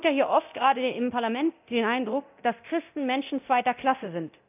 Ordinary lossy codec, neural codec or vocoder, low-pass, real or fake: none; codec, 24 kHz, 0.9 kbps, DualCodec; 3.6 kHz; fake